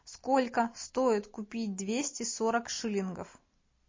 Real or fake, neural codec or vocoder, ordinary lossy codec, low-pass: real; none; MP3, 32 kbps; 7.2 kHz